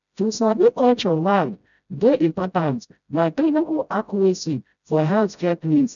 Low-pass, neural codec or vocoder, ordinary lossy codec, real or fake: 7.2 kHz; codec, 16 kHz, 0.5 kbps, FreqCodec, smaller model; none; fake